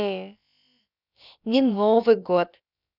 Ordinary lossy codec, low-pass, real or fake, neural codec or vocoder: none; 5.4 kHz; fake; codec, 16 kHz, about 1 kbps, DyCAST, with the encoder's durations